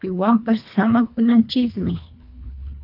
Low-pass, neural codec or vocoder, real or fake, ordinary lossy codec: 5.4 kHz; codec, 24 kHz, 1.5 kbps, HILCodec; fake; AAC, 48 kbps